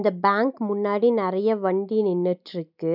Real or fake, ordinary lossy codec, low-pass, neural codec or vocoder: real; none; 5.4 kHz; none